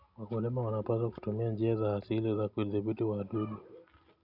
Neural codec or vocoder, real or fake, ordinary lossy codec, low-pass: none; real; none; 5.4 kHz